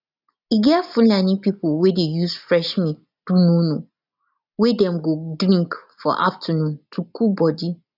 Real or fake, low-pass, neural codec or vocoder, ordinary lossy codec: real; 5.4 kHz; none; none